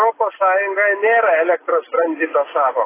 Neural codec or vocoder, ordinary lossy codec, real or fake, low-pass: none; AAC, 16 kbps; real; 3.6 kHz